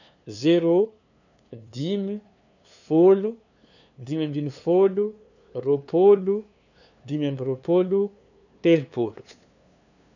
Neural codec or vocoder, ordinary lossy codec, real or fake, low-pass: codec, 16 kHz, 2 kbps, FunCodec, trained on LibriTTS, 25 frames a second; none; fake; 7.2 kHz